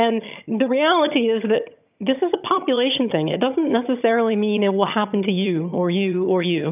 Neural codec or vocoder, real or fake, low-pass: vocoder, 22.05 kHz, 80 mel bands, HiFi-GAN; fake; 3.6 kHz